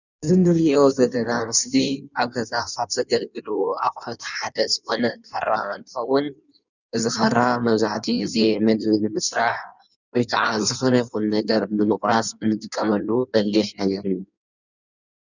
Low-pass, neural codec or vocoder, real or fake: 7.2 kHz; codec, 16 kHz in and 24 kHz out, 1.1 kbps, FireRedTTS-2 codec; fake